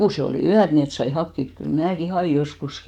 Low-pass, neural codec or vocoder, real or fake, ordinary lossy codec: 19.8 kHz; codec, 44.1 kHz, 7.8 kbps, DAC; fake; none